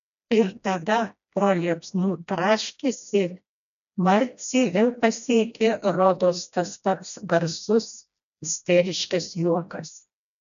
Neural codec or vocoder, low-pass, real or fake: codec, 16 kHz, 1 kbps, FreqCodec, smaller model; 7.2 kHz; fake